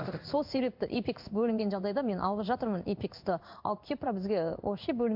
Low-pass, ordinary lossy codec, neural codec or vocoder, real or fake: 5.4 kHz; MP3, 48 kbps; codec, 16 kHz in and 24 kHz out, 1 kbps, XY-Tokenizer; fake